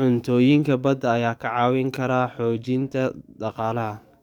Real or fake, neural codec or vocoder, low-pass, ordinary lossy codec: fake; codec, 44.1 kHz, 7.8 kbps, DAC; 19.8 kHz; none